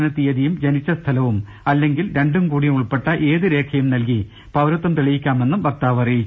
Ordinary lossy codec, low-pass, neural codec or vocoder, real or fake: none; none; none; real